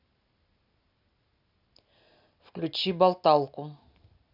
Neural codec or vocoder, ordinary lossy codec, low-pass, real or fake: none; none; 5.4 kHz; real